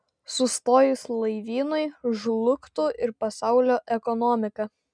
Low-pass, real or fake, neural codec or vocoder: 9.9 kHz; real; none